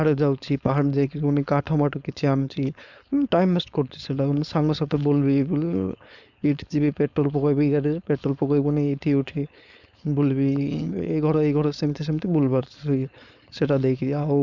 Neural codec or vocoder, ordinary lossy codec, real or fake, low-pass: codec, 16 kHz, 4.8 kbps, FACodec; none; fake; 7.2 kHz